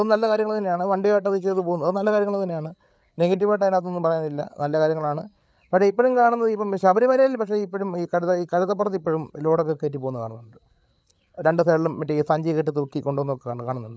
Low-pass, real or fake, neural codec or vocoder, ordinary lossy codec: none; fake; codec, 16 kHz, 8 kbps, FreqCodec, larger model; none